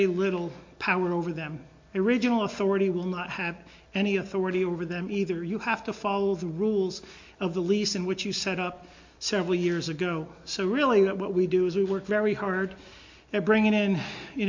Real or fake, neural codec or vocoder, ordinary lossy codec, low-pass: real; none; MP3, 48 kbps; 7.2 kHz